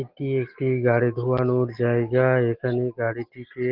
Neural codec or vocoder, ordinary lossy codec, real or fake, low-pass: none; Opus, 16 kbps; real; 5.4 kHz